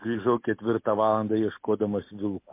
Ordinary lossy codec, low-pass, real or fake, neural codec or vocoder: MP3, 24 kbps; 3.6 kHz; real; none